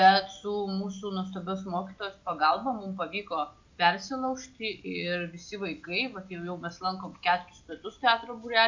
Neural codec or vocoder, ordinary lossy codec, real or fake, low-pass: none; MP3, 64 kbps; real; 7.2 kHz